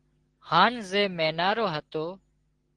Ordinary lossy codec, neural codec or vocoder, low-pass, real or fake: Opus, 16 kbps; none; 9.9 kHz; real